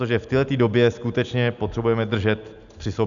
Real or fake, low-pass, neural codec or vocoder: real; 7.2 kHz; none